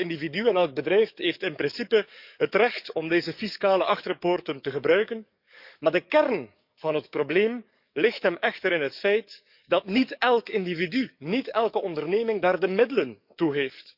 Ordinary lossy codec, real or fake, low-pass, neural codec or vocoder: none; fake; 5.4 kHz; codec, 44.1 kHz, 7.8 kbps, DAC